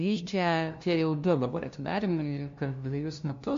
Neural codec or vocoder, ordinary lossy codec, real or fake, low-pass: codec, 16 kHz, 0.5 kbps, FunCodec, trained on LibriTTS, 25 frames a second; MP3, 48 kbps; fake; 7.2 kHz